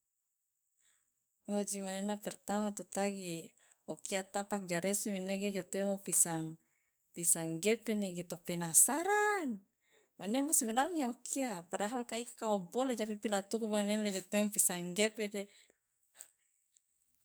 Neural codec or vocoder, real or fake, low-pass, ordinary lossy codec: codec, 44.1 kHz, 2.6 kbps, SNAC; fake; none; none